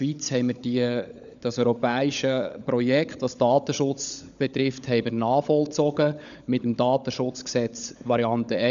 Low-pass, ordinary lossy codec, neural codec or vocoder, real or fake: 7.2 kHz; none; codec, 16 kHz, 16 kbps, FunCodec, trained on LibriTTS, 50 frames a second; fake